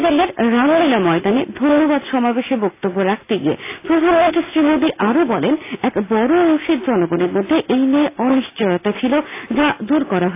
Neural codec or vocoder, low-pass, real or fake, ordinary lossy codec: none; 3.6 kHz; real; AAC, 24 kbps